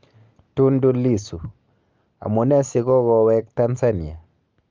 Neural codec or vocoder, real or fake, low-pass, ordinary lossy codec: none; real; 7.2 kHz; Opus, 24 kbps